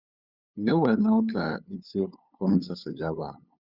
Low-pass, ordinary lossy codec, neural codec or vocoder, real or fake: 5.4 kHz; Opus, 64 kbps; codec, 16 kHz, 8 kbps, FunCodec, trained on LibriTTS, 25 frames a second; fake